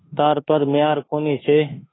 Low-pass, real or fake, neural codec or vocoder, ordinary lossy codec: 7.2 kHz; fake; autoencoder, 48 kHz, 32 numbers a frame, DAC-VAE, trained on Japanese speech; AAC, 16 kbps